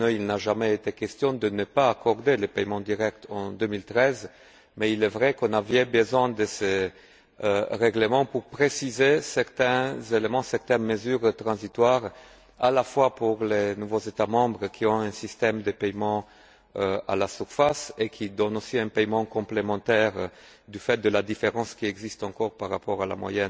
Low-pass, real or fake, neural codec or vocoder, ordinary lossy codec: none; real; none; none